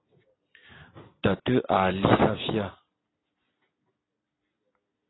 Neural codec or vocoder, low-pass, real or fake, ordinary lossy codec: vocoder, 44.1 kHz, 128 mel bands every 256 samples, BigVGAN v2; 7.2 kHz; fake; AAC, 16 kbps